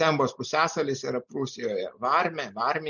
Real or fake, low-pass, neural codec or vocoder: real; 7.2 kHz; none